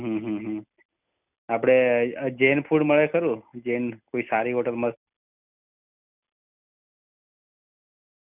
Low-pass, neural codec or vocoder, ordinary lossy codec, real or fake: 3.6 kHz; none; none; real